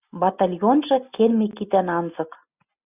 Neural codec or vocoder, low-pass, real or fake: none; 3.6 kHz; real